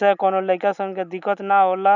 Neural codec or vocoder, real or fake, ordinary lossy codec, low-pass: none; real; none; 7.2 kHz